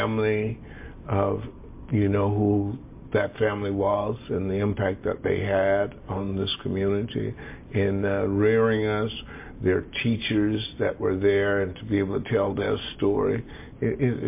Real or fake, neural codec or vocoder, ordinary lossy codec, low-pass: real; none; MP3, 24 kbps; 3.6 kHz